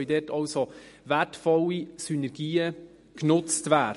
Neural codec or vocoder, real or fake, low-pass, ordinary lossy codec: none; real; 14.4 kHz; MP3, 48 kbps